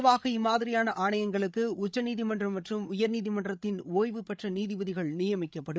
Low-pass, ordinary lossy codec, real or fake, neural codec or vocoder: none; none; fake; codec, 16 kHz, 8 kbps, FreqCodec, larger model